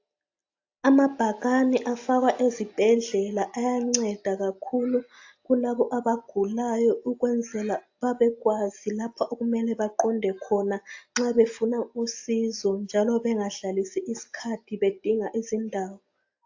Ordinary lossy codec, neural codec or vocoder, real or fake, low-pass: AAC, 48 kbps; none; real; 7.2 kHz